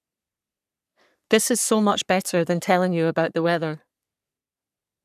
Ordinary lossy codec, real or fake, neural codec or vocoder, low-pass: none; fake; codec, 44.1 kHz, 3.4 kbps, Pupu-Codec; 14.4 kHz